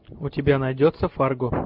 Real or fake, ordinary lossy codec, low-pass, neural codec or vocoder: real; MP3, 48 kbps; 5.4 kHz; none